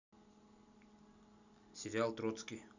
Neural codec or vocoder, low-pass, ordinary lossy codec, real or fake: none; 7.2 kHz; none; real